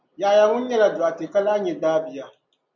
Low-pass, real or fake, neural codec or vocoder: 7.2 kHz; real; none